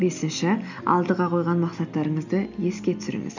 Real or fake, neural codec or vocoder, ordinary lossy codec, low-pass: real; none; none; 7.2 kHz